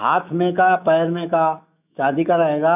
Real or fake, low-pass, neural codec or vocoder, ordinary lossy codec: fake; 3.6 kHz; autoencoder, 48 kHz, 128 numbers a frame, DAC-VAE, trained on Japanese speech; none